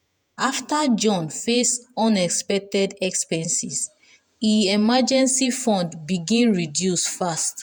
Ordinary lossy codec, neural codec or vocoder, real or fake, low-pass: none; vocoder, 48 kHz, 128 mel bands, Vocos; fake; 19.8 kHz